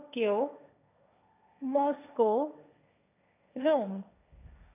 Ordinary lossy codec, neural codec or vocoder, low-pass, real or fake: none; codec, 16 kHz, 1.1 kbps, Voila-Tokenizer; 3.6 kHz; fake